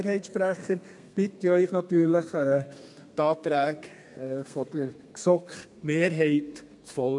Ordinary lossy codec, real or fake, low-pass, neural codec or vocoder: MP3, 64 kbps; fake; 10.8 kHz; codec, 32 kHz, 1.9 kbps, SNAC